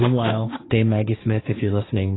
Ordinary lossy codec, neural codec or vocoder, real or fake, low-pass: AAC, 16 kbps; codec, 16 kHz, 1.1 kbps, Voila-Tokenizer; fake; 7.2 kHz